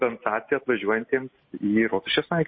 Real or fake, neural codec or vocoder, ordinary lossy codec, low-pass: real; none; MP3, 24 kbps; 7.2 kHz